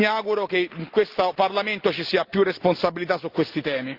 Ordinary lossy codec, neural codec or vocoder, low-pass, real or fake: Opus, 32 kbps; none; 5.4 kHz; real